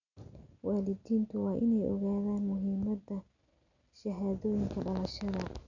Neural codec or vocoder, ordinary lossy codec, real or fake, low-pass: none; none; real; 7.2 kHz